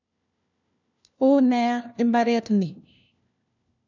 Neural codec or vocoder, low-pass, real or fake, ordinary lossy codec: codec, 16 kHz, 1 kbps, FunCodec, trained on LibriTTS, 50 frames a second; 7.2 kHz; fake; none